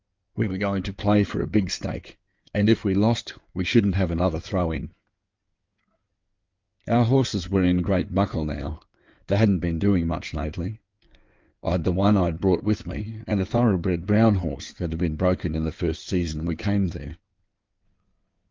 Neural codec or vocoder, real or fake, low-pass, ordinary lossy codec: codec, 16 kHz in and 24 kHz out, 2.2 kbps, FireRedTTS-2 codec; fake; 7.2 kHz; Opus, 24 kbps